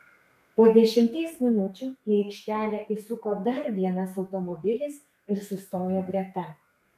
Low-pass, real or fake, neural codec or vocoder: 14.4 kHz; fake; codec, 32 kHz, 1.9 kbps, SNAC